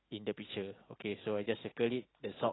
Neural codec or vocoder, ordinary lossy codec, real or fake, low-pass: none; AAC, 16 kbps; real; 7.2 kHz